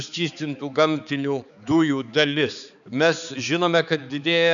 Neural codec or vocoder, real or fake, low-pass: codec, 16 kHz, 4 kbps, X-Codec, HuBERT features, trained on balanced general audio; fake; 7.2 kHz